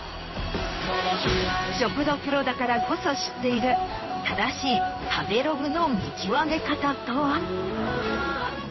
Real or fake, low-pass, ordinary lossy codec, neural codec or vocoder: fake; 7.2 kHz; MP3, 24 kbps; codec, 16 kHz in and 24 kHz out, 1 kbps, XY-Tokenizer